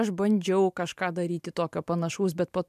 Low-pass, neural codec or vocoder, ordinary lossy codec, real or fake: 14.4 kHz; vocoder, 44.1 kHz, 128 mel bands every 512 samples, BigVGAN v2; MP3, 96 kbps; fake